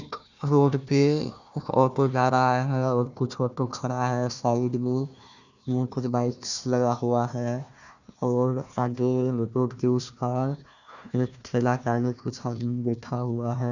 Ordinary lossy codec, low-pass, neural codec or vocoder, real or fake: none; 7.2 kHz; codec, 16 kHz, 1 kbps, FunCodec, trained on Chinese and English, 50 frames a second; fake